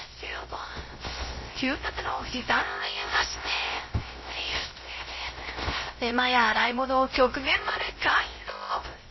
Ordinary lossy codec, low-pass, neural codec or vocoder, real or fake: MP3, 24 kbps; 7.2 kHz; codec, 16 kHz, 0.3 kbps, FocalCodec; fake